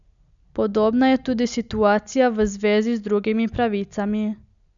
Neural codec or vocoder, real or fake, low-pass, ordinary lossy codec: none; real; 7.2 kHz; none